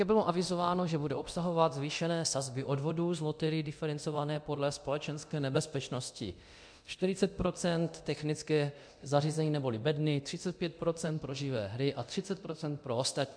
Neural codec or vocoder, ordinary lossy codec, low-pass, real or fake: codec, 24 kHz, 0.9 kbps, DualCodec; MP3, 64 kbps; 9.9 kHz; fake